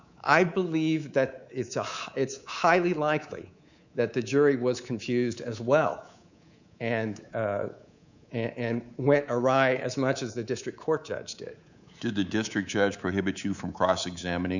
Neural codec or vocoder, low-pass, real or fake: codec, 24 kHz, 3.1 kbps, DualCodec; 7.2 kHz; fake